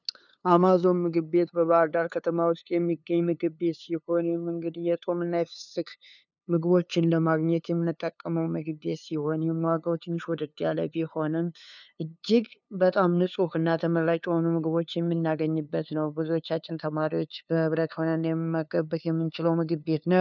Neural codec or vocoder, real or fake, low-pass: codec, 16 kHz, 2 kbps, FunCodec, trained on LibriTTS, 25 frames a second; fake; 7.2 kHz